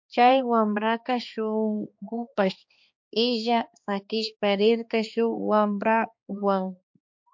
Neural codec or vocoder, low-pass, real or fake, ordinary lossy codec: codec, 16 kHz, 2 kbps, X-Codec, HuBERT features, trained on balanced general audio; 7.2 kHz; fake; MP3, 48 kbps